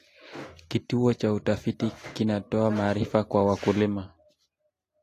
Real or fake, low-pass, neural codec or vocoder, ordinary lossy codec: real; 14.4 kHz; none; AAC, 48 kbps